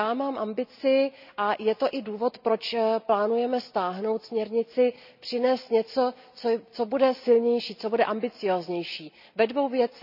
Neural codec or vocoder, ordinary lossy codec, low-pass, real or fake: none; none; 5.4 kHz; real